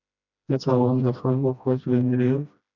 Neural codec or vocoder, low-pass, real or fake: codec, 16 kHz, 1 kbps, FreqCodec, smaller model; 7.2 kHz; fake